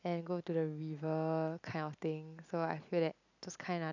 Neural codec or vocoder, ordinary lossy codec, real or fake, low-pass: none; none; real; 7.2 kHz